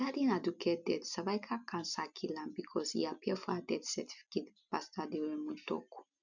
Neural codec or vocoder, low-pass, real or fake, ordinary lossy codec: none; 7.2 kHz; real; none